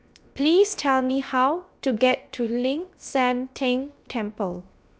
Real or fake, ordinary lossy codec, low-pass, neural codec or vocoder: fake; none; none; codec, 16 kHz, 0.7 kbps, FocalCodec